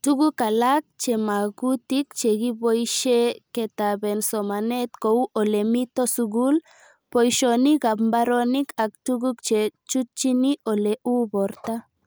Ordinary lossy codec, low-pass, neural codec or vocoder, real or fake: none; none; none; real